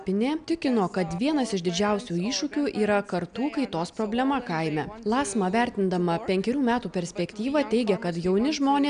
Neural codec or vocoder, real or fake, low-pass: none; real; 9.9 kHz